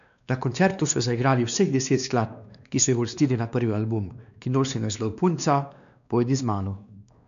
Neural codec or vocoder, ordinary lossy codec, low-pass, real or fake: codec, 16 kHz, 2 kbps, X-Codec, WavLM features, trained on Multilingual LibriSpeech; none; 7.2 kHz; fake